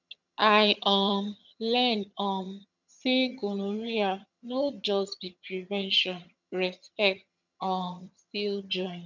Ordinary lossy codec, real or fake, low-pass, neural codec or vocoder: none; fake; 7.2 kHz; vocoder, 22.05 kHz, 80 mel bands, HiFi-GAN